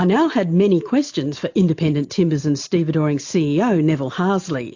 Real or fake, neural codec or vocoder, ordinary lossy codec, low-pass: real; none; AAC, 48 kbps; 7.2 kHz